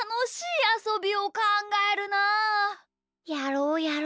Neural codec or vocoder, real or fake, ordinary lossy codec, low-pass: none; real; none; none